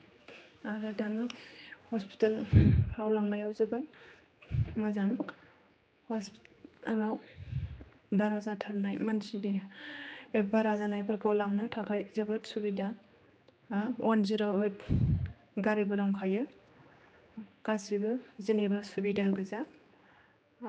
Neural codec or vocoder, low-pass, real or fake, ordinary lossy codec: codec, 16 kHz, 2 kbps, X-Codec, HuBERT features, trained on general audio; none; fake; none